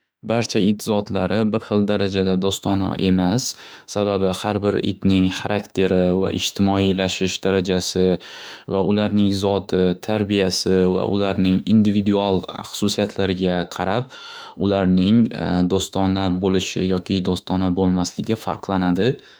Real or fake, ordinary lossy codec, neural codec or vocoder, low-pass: fake; none; autoencoder, 48 kHz, 32 numbers a frame, DAC-VAE, trained on Japanese speech; none